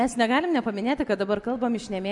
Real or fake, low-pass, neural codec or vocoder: real; 10.8 kHz; none